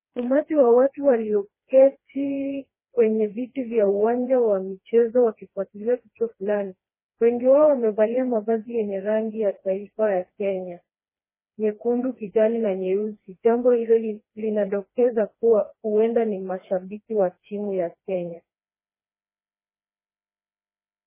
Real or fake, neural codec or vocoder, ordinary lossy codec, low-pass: fake; codec, 16 kHz, 2 kbps, FreqCodec, smaller model; MP3, 16 kbps; 3.6 kHz